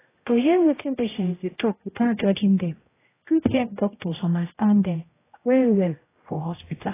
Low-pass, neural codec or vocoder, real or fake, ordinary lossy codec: 3.6 kHz; codec, 16 kHz, 0.5 kbps, X-Codec, HuBERT features, trained on general audio; fake; AAC, 16 kbps